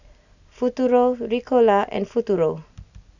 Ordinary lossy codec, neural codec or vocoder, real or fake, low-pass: none; none; real; 7.2 kHz